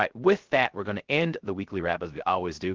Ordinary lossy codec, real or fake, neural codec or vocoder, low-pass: Opus, 16 kbps; fake; codec, 16 kHz, 0.3 kbps, FocalCodec; 7.2 kHz